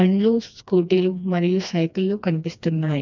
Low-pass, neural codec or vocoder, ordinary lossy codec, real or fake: 7.2 kHz; codec, 16 kHz, 2 kbps, FreqCodec, smaller model; none; fake